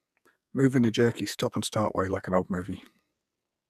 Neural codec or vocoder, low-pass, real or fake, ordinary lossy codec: codec, 44.1 kHz, 2.6 kbps, SNAC; 14.4 kHz; fake; none